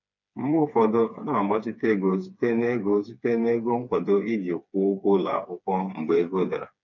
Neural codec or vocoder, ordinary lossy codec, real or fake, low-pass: codec, 16 kHz, 4 kbps, FreqCodec, smaller model; none; fake; 7.2 kHz